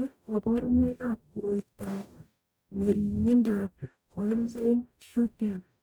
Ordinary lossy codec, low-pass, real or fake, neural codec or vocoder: none; none; fake; codec, 44.1 kHz, 0.9 kbps, DAC